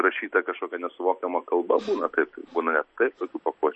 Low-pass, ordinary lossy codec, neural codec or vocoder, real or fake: 10.8 kHz; MP3, 32 kbps; none; real